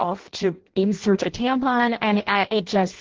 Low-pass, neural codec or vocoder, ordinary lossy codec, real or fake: 7.2 kHz; codec, 16 kHz in and 24 kHz out, 0.6 kbps, FireRedTTS-2 codec; Opus, 16 kbps; fake